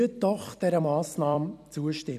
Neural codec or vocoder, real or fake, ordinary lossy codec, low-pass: vocoder, 44.1 kHz, 128 mel bands every 256 samples, BigVGAN v2; fake; none; 14.4 kHz